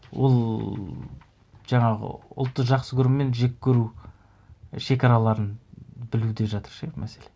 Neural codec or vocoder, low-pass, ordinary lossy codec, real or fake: none; none; none; real